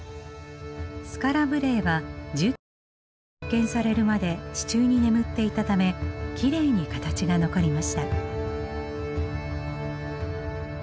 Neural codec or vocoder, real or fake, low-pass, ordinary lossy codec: none; real; none; none